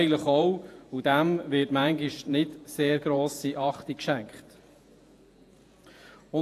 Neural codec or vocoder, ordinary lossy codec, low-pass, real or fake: vocoder, 48 kHz, 128 mel bands, Vocos; AAC, 64 kbps; 14.4 kHz; fake